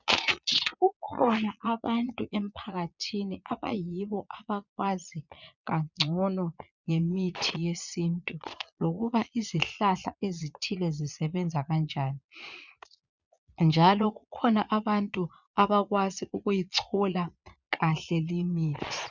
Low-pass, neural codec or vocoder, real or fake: 7.2 kHz; vocoder, 22.05 kHz, 80 mel bands, Vocos; fake